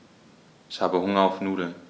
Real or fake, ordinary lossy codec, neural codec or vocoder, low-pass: real; none; none; none